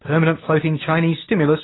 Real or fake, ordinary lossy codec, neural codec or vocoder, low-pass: real; AAC, 16 kbps; none; 7.2 kHz